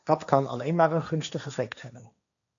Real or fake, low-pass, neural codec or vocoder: fake; 7.2 kHz; codec, 16 kHz, 1.1 kbps, Voila-Tokenizer